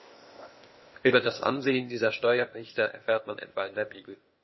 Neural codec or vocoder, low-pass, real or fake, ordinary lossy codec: codec, 16 kHz, 0.8 kbps, ZipCodec; 7.2 kHz; fake; MP3, 24 kbps